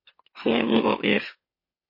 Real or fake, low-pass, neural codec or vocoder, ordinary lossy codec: fake; 5.4 kHz; autoencoder, 44.1 kHz, a latent of 192 numbers a frame, MeloTTS; MP3, 24 kbps